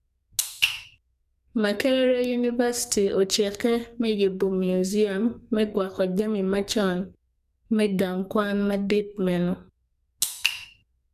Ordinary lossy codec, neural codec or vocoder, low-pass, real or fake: none; codec, 44.1 kHz, 2.6 kbps, SNAC; 14.4 kHz; fake